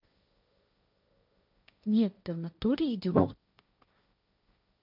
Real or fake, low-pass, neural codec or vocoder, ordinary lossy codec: fake; 5.4 kHz; codec, 16 kHz, 1.1 kbps, Voila-Tokenizer; none